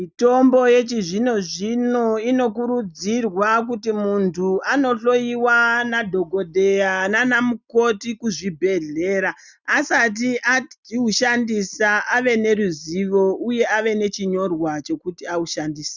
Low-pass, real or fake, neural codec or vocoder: 7.2 kHz; real; none